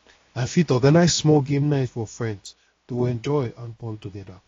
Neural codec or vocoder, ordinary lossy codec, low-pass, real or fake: codec, 16 kHz, 0.7 kbps, FocalCodec; AAC, 32 kbps; 7.2 kHz; fake